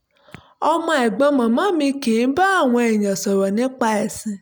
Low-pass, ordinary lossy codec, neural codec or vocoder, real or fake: none; none; none; real